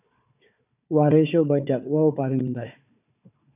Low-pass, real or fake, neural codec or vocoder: 3.6 kHz; fake; codec, 16 kHz, 4 kbps, FunCodec, trained on Chinese and English, 50 frames a second